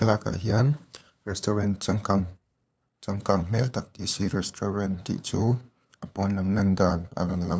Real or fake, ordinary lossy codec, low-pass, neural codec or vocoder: fake; none; none; codec, 16 kHz, 2 kbps, FunCodec, trained on LibriTTS, 25 frames a second